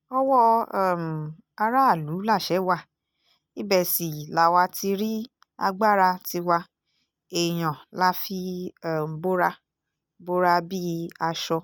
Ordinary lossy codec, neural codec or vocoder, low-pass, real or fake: none; none; none; real